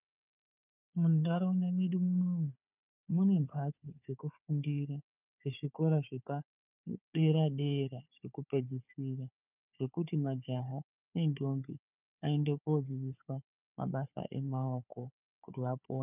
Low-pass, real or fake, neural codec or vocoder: 3.6 kHz; fake; codec, 16 kHz, 4 kbps, FunCodec, trained on Chinese and English, 50 frames a second